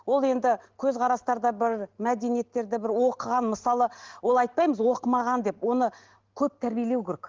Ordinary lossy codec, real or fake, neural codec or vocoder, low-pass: Opus, 16 kbps; real; none; 7.2 kHz